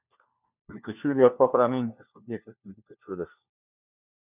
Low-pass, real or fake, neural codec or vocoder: 3.6 kHz; fake; codec, 16 kHz, 1 kbps, FunCodec, trained on LibriTTS, 50 frames a second